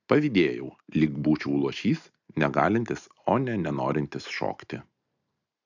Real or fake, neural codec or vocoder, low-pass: real; none; 7.2 kHz